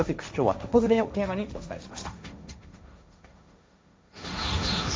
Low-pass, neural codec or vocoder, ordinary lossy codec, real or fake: none; codec, 16 kHz, 1.1 kbps, Voila-Tokenizer; none; fake